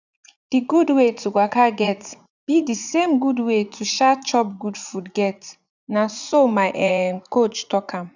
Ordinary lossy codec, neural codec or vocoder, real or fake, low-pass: none; vocoder, 44.1 kHz, 80 mel bands, Vocos; fake; 7.2 kHz